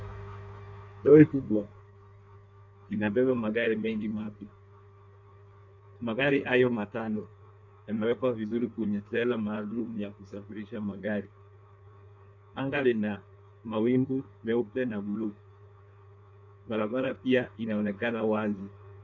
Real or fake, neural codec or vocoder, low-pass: fake; codec, 16 kHz in and 24 kHz out, 1.1 kbps, FireRedTTS-2 codec; 7.2 kHz